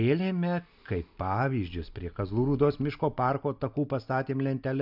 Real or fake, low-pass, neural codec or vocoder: real; 5.4 kHz; none